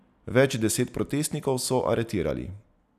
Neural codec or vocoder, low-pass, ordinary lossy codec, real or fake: none; 14.4 kHz; none; real